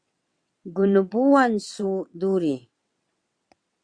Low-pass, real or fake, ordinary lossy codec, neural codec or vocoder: 9.9 kHz; fake; Opus, 64 kbps; vocoder, 22.05 kHz, 80 mel bands, WaveNeXt